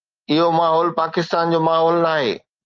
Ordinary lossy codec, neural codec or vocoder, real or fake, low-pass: Opus, 24 kbps; none; real; 7.2 kHz